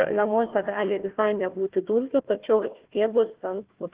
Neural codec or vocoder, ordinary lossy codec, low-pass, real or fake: codec, 16 kHz, 1 kbps, FunCodec, trained on Chinese and English, 50 frames a second; Opus, 16 kbps; 3.6 kHz; fake